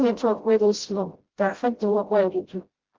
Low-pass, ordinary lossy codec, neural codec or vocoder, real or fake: 7.2 kHz; Opus, 16 kbps; codec, 16 kHz, 0.5 kbps, FreqCodec, smaller model; fake